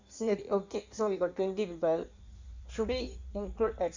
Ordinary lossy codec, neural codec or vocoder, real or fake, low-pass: Opus, 64 kbps; codec, 16 kHz in and 24 kHz out, 1.1 kbps, FireRedTTS-2 codec; fake; 7.2 kHz